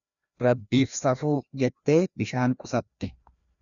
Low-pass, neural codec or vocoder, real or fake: 7.2 kHz; codec, 16 kHz, 1 kbps, FreqCodec, larger model; fake